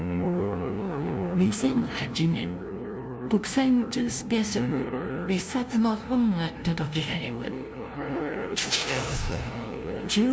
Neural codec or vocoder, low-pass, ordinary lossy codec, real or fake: codec, 16 kHz, 0.5 kbps, FunCodec, trained on LibriTTS, 25 frames a second; none; none; fake